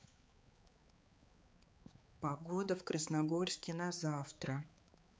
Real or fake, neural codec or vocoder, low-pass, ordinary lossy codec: fake; codec, 16 kHz, 4 kbps, X-Codec, HuBERT features, trained on balanced general audio; none; none